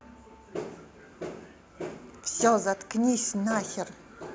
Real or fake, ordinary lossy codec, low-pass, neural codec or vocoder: real; none; none; none